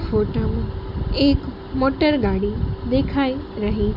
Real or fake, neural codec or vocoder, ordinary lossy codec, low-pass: real; none; none; 5.4 kHz